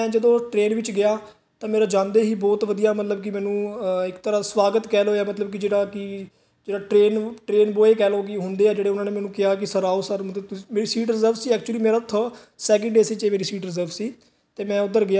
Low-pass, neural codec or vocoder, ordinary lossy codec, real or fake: none; none; none; real